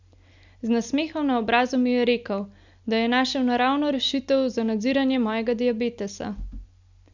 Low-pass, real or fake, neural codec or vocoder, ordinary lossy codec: 7.2 kHz; real; none; none